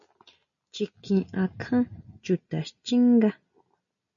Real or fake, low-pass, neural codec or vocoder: real; 7.2 kHz; none